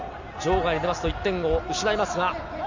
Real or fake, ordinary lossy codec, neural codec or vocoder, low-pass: real; none; none; 7.2 kHz